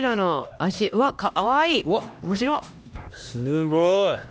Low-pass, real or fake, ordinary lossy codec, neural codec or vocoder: none; fake; none; codec, 16 kHz, 1 kbps, X-Codec, HuBERT features, trained on LibriSpeech